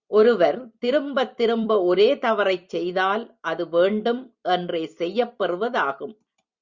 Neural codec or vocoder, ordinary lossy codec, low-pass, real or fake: vocoder, 44.1 kHz, 128 mel bands every 256 samples, BigVGAN v2; Opus, 64 kbps; 7.2 kHz; fake